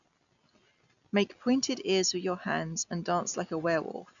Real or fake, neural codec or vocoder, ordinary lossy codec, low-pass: real; none; none; 7.2 kHz